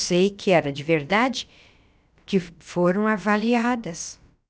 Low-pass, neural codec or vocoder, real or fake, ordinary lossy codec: none; codec, 16 kHz, about 1 kbps, DyCAST, with the encoder's durations; fake; none